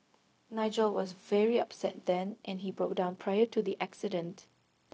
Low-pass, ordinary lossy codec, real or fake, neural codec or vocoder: none; none; fake; codec, 16 kHz, 0.4 kbps, LongCat-Audio-Codec